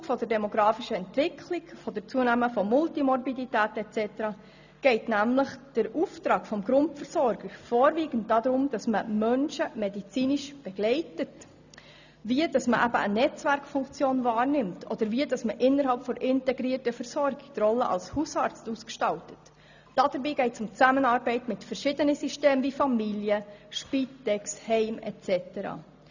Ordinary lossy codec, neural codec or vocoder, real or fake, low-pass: none; none; real; 7.2 kHz